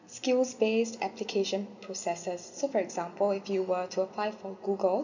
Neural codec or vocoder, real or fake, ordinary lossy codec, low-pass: none; real; none; 7.2 kHz